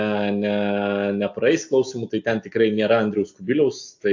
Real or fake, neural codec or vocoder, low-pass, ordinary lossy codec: real; none; 7.2 kHz; AAC, 48 kbps